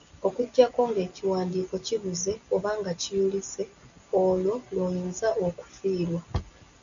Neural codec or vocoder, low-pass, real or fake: none; 7.2 kHz; real